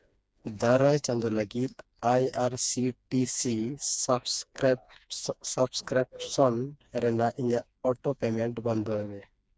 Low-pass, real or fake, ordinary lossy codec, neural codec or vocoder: none; fake; none; codec, 16 kHz, 2 kbps, FreqCodec, smaller model